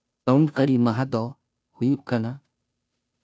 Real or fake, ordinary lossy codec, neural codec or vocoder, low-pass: fake; none; codec, 16 kHz, 0.5 kbps, FunCodec, trained on Chinese and English, 25 frames a second; none